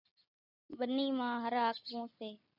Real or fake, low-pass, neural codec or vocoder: real; 5.4 kHz; none